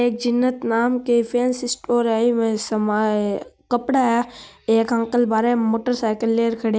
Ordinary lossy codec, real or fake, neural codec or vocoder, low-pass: none; real; none; none